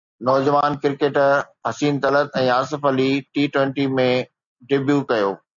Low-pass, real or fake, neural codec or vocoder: 7.2 kHz; real; none